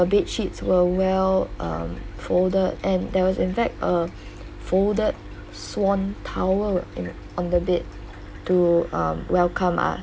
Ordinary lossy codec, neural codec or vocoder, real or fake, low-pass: none; none; real; none